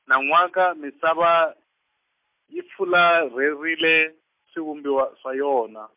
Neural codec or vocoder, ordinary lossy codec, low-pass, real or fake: none; MP3, 32 kbps; 3.6 kHz; real